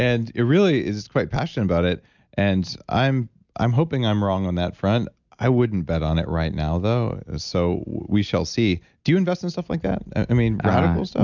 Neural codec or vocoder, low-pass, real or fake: none; 7.2 kHz; real